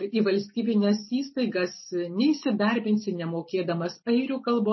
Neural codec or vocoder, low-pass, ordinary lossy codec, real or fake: none; 7.2 kHz; MP3, 24 kbps; real